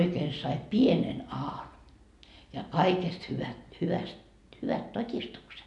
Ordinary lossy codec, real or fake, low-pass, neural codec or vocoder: MP3, 64 kbps; real; 10.8 kHz; none